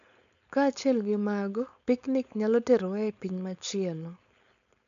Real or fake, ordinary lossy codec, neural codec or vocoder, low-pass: fake; none; codec, 16 kHz, 4.8 kbps, FACodec; 7.2 kHz